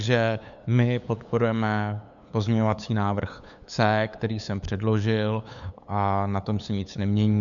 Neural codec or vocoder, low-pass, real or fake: codec, 16 kHz, 8 kbps, FunCodec, trained on LibriTTS, 25 frames a second; 7.2 kHz; fake